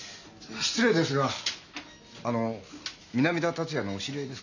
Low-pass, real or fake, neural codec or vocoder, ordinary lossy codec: 7.2 kHz; real; none; none